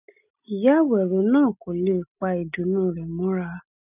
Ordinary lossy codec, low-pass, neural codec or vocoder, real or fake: none; 3.6 kHz; none; real